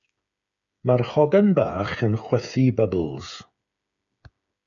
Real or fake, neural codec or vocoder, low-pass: fake; codec, 16 kHz, 8 kbps, FreqCodec, smaller model; 7.2 kHz